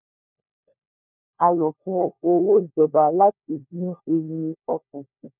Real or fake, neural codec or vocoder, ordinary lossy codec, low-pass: fake; codec, 16 kHz, 1 kbps, FunCodec, trained on LibriTTS, 50 frames a second; none; 3.6 kHz